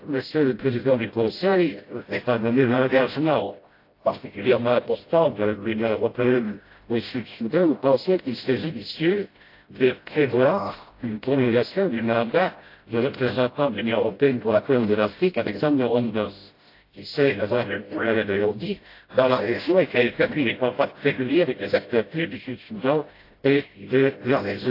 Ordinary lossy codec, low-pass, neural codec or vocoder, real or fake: AAC, 32 kbps; 5.4 kHz; codec, 16 kHz, 0.5 kbps, FreqCodec, smaller model; fake